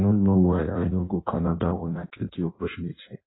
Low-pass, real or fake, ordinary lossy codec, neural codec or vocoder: 7.2 kHz; fake; AAC, 16 kbps; codec, 16 kHz in and 24 kHz out, 0.6 kbps, FireRedTTS-2 codec